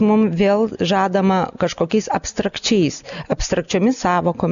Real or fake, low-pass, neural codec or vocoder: real; 7.2 kHz; none